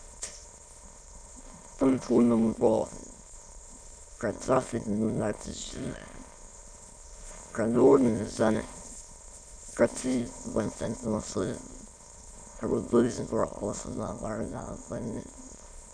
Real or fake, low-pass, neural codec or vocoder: fake; 9.9 kHz; autoencoder, 22.05 kHz, a latent of 192 numbers a frame, VITS, trained on many speakers